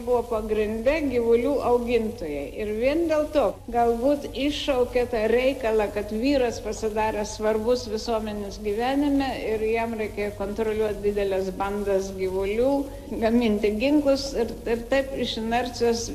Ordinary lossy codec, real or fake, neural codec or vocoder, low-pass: MP3, 96 kbps; real; none; 14.4 kHz